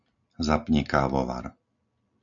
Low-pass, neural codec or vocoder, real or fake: 7.2 kHz; none; real